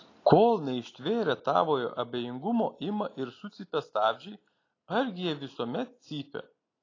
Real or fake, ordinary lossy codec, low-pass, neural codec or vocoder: real; AAC, 32 kbps; 7.2 kHz; none